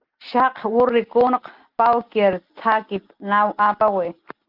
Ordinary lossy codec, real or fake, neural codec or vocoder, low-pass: Opus, 32 kbps; real; none; 5.4 kHz